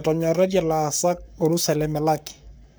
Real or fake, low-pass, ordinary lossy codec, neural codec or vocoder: fake; none; none; codec, 44.1 kHz, 7.8 kbps, Pupu-Codec